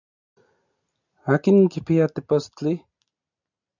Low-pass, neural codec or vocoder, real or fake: 7.2 kHz; none; real